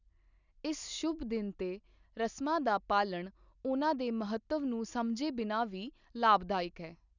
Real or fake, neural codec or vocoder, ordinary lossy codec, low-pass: real; none; none; 7.2 kHz